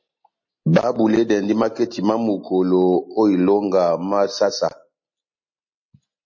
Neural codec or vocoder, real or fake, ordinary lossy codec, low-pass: none; real; MP3, 32 kbps; 7.2 kHz